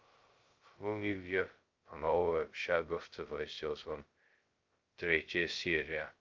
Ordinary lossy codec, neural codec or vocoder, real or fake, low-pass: Opus, 32 kbps; codec, 16 kHz, 0.2 kbps, FocalCodec; fake; 7.2 kHz